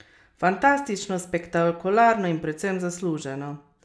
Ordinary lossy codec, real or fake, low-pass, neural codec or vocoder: none; real; none; none